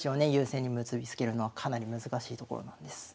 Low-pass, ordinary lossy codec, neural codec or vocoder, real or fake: none; none; none; real